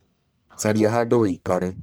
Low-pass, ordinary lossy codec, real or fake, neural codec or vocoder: none; none; fake; codec, 44.1 kHz, 1.7 kbps, Pupu-Codec